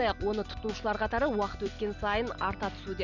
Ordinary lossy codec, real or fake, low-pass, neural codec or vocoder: none; real; 7.2 kHz; none